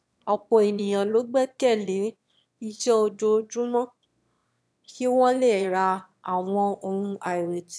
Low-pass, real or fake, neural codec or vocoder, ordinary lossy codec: none; fake; autoencoder, 22.05 kHz, a latent of 192 numbers a frame, VITS, trained on one speaker; none